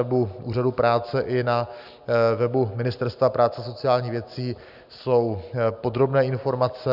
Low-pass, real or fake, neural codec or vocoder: 5.4 kHz; real; none